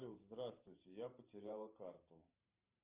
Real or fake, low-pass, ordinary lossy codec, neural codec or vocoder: fake; 3.6 kHz; Opus, 24 kbps; vocoder, 44.1 kHz, 128 mel bands every 512 samples, BigVGAN v2